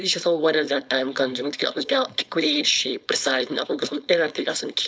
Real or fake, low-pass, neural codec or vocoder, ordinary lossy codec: fake; none; codec, 16 kHz, 4.8 kbps, FACodec; none